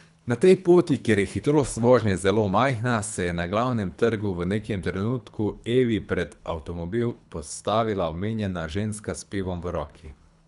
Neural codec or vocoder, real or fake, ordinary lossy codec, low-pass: codec, 24 kHz, 3 kbps, HILCodec; fake; none; 10.8 kHz